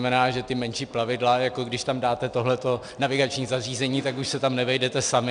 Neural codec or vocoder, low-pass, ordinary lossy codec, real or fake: none; 9.9 kHz; MP3, 96 kbps; real